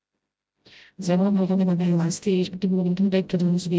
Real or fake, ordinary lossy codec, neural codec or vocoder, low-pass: fake; none; codec, 16 kHz, 0.5 kbps, FreqCodec, smaller model; none